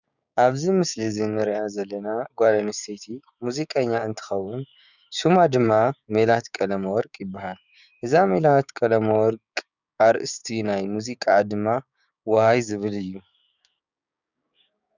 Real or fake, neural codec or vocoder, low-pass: fake; codec, 16 kHz, 6 kbps, DAC; 7.2 kHz